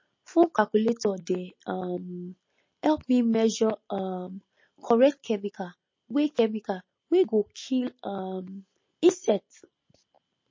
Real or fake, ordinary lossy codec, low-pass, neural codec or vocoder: real; MP3, 32 kbps; 7.2 kHz; none